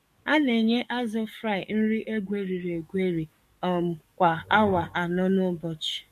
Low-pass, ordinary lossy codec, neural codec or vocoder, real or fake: 14.4 kHz; MP3, 64 kbps; codec, 44.1 kHz, 7.8 kbps, DAC; fake